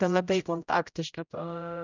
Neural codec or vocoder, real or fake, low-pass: codec, 16 kHz, 0.5 kbps, X-Codec, HuBERT features, trained on general audio; fake; 7.2 kHz